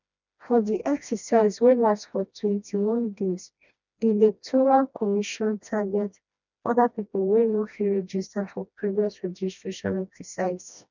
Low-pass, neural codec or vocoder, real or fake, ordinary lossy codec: 7.2 kHz; codec, 16 kHz, 1 kbps, FreqCodec, smaller model; fake; none